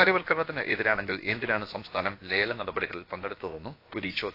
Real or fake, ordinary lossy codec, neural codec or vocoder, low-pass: fake; AAC, 32 kbps; codec, 16 kHz, about 1 kbps, DyCAST, with the encoder's durations; 5.4 kHz